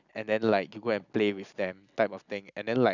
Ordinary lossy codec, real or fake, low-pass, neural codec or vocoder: none; real; 7.2 kHz; none